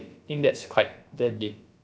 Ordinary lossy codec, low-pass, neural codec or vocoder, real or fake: none; none; codec, 16 kHz, about 1 kbps, DyCAST, with the encoder's durations; fake